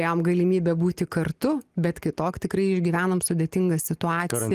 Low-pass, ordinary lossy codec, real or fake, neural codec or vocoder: 14.4 kHz; Opus, 24 kbps; real; none